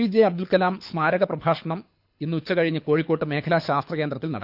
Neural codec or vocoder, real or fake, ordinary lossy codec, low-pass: codec, 24 kHz, 6 kbps, HILCodec; fake; none; 5.4 kHz